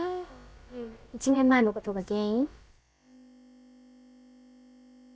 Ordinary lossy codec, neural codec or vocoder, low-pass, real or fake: none; codec, 16 kHz, about 1 kbps, DyCAST, with the encoder's durations; none; fake